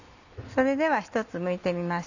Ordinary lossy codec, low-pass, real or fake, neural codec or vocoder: none; 7.2 kHz; real; none